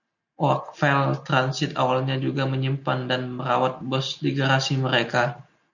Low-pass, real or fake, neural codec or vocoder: 7.2 kHz; real; none